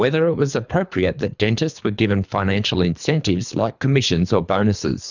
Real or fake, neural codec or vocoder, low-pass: fake; codec, 24 kHz, 3 kbps, HILCodec; 7.2 kHz